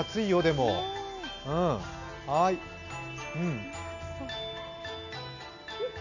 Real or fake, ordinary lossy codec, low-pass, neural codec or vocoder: real; none; 7.2 kHz; none